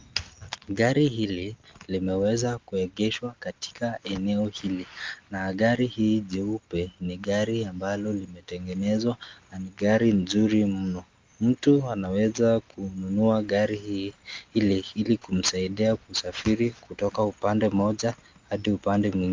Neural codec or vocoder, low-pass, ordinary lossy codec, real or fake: none; 7.2 kHz; Opus, 24 kbps; real